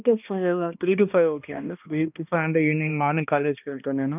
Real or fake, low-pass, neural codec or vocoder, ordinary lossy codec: fake; 3.6 kHz; codec, 16 kHz, 1 kbps, X-Codec, HuBERT features, trained on balanced general audio; none